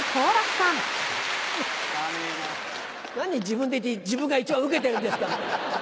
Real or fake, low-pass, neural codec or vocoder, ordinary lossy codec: real; none; none; none